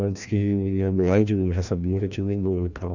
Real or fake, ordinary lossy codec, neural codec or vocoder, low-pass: fake; none; codec, 16 kHz, 1 kbps, FreqCodec, larger model; 7.2 kHz